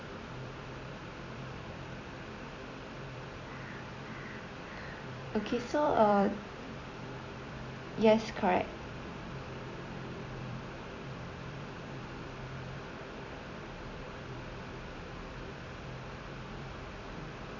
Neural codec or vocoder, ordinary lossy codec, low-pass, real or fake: vocoder, 44.1 kHz, 128 mel bands every 256 samples, BigVGAN v2; none; 7.2 kHz; fake